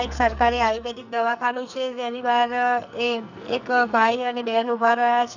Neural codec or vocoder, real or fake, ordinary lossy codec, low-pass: codec, 44.1 kHz, 2.6 kbps, SNAC; fake; none; 7.2 kHz